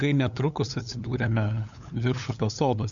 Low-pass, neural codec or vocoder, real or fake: 7.2 kHz; codec, 16 kHz, 8 kbps, FunCodec, trained on LibriTTS, 25 frames a second; fake